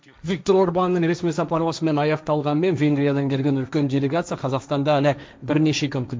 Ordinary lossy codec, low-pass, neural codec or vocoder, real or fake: none; none; codec, 16 kHz, 1.1 kbps, Voila-Tokenizer; fake